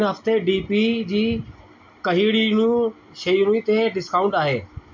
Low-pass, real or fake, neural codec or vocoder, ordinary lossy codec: 7.2 kHz; real; none; MP3, 48 kbps